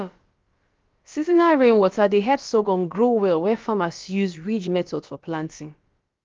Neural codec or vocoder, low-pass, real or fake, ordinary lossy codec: codec, 16 kHz, about 1 kbps, DyCAST, with the encoder's durations; 7.2 kHz; fake; Opus, 32 kbps